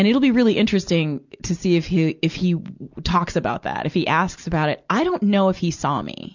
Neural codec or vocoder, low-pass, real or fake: none; 7.2 kHz; real